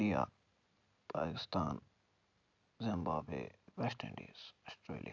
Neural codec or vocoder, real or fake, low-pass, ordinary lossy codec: none; real; 7.2 kHz; none